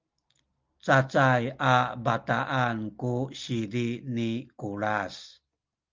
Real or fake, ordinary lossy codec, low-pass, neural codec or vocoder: real; Opus, 32 kbps; 7.2 kHz; none